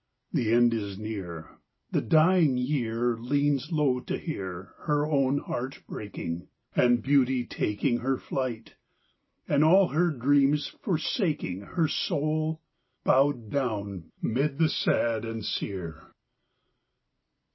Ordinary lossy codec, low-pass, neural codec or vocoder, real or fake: MP3, 24 kbps; 7.2 kHz; none; real